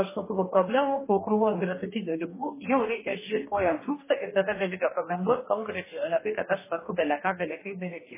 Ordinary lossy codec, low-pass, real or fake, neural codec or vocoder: MP3, 16 kbps; 3.6 kHz; fake; codec, 44.1 kHz, 2.6 kbps, DAC